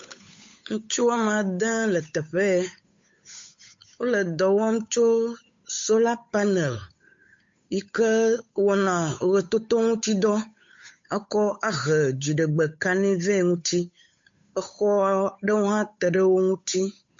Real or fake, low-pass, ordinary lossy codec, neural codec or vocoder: fake; 7.2 kHz; MP3, 48 kbps; codec, 16 kHz, 8 kbps, FunCodec, trained on Chinese and English, 25 frames a second